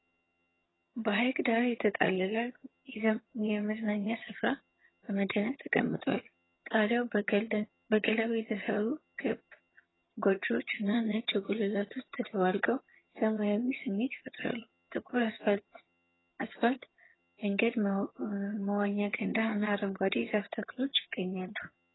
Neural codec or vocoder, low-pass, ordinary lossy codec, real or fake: vocoder, 22.05 kHz, 80 mel bands, HiFi-GAN; 7.2 kHz; AAC, 16 kbps; fake